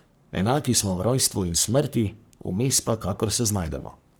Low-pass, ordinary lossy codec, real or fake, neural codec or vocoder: none; none; fake; codec, 44.1 kHz, 3.4 kbps, Pupu-Codec